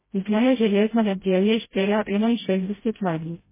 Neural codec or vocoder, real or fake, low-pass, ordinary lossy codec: codec, 16 kHz, 0.5 kbps, FreqCodec, smaller model; fake; 3.6 kHz; MP3, 16 kbps